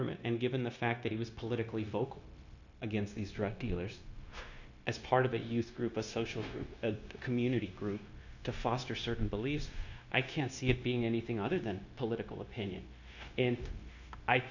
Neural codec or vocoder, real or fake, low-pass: codec, 16 kHz, 0.9 kbps, LongCat-Audio-Codec; fake; 7.2 kHz